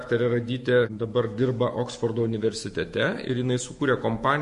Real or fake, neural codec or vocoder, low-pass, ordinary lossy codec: fake; autoencoder, 48 kHz, 128 numbers a frame, DAC-VAE, trained on Japanese speech; 14.4 kHz; MP3, 48 kbps